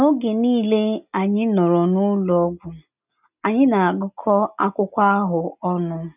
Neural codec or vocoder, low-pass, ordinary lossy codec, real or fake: none; 3.6 kHz; none; real